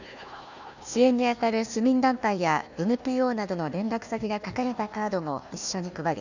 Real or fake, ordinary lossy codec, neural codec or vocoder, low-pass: fake; none; codec, 16 kHz, 1 kbps, FunCodec, trained on Chinese and English, 50 frames a second; 7.2 kHz